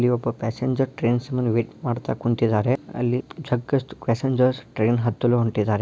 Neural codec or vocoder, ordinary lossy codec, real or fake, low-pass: none; none; real; none